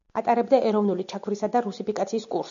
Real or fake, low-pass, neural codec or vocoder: real; 7.2 kHz; none